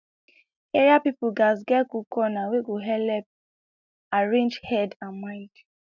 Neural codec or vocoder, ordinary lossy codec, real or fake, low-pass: none; none; real; 7.2 kHz